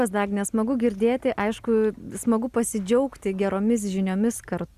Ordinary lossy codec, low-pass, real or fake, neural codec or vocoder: Opus, 64 kbps; 14.4 kHz; real; none